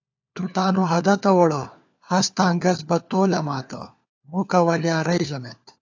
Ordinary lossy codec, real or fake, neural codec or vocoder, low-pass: AAC, 48 kbps; fake; codec, 16 kHz, 4 kbps, FunCodec, trained on LibriTTS, 50 frames a second; 7.2 kHz